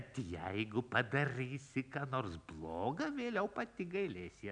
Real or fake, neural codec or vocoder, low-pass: real; none; 9.9 kHz